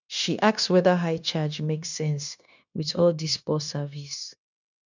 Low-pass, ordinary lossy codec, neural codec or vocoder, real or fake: 7.2 kHz; none; codec, 16 kHz, 0.9 kbps, LongCat-Audio-Codec; fake